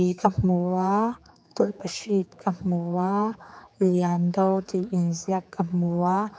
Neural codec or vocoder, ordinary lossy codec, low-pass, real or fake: codec, 16 kHz, 4 kbps, X-Codec, HuBERT features, trained on general audio; none; none; fake